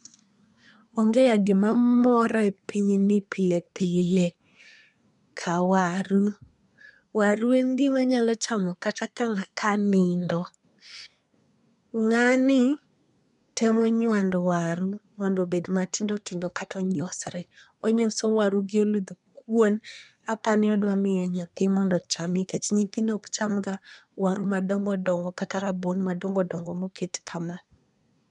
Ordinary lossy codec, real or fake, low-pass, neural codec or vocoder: none; fake; 10.8 kHz; codec, 24 kHz, 1 kbps, SNAC